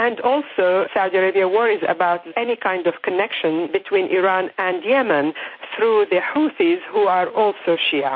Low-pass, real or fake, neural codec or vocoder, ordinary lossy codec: 7.2 kHz; real; none; MP3, 32 kbps